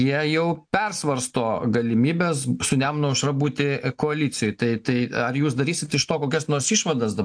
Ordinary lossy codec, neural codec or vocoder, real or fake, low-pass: AAC, 64 kbps; none; real; 9.9 kHz